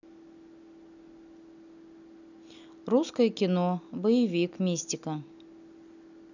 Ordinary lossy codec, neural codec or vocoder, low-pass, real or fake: none; none; 7.2 kHz; real